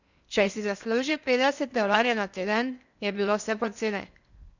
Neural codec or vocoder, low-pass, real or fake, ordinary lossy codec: codec, 16 kHz in and 24 kHz out, 0.8 kbps, FocalCodec, streaming, 65536 codes; 7.2 kHz; fake; none